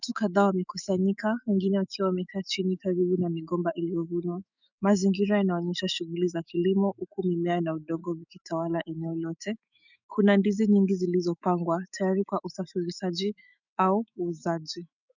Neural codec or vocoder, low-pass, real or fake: autoencoder, 48 kHz, 128 numbers a frame, DAC-VAE, trained on Japanese speech; 7.2 kHz; fake